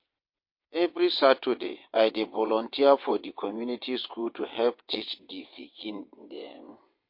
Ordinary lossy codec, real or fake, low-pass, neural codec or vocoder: MP3, 32 kbps; fake; 5.4 kHz; vocoder, 22.05 kHz, 80 mel bands, WaveNeXt